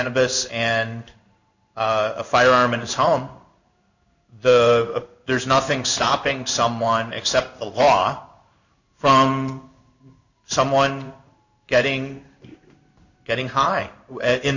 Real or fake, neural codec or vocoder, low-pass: fake; codec, 16 kHz in and 24 kHz out, 1 kbps, XY-Tokenizer; 7.2 kHz